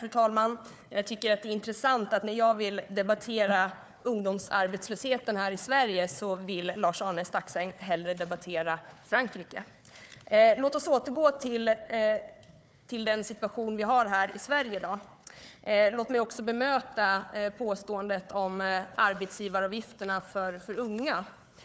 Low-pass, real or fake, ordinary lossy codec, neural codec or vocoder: none; fake; none; codec, 16 kHz, 4 kbps, FunCodec, trained on Chinese and English, 50 frames a second